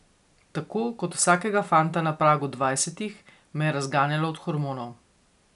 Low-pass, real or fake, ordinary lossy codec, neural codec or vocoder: 10.8 kHz; real; none; none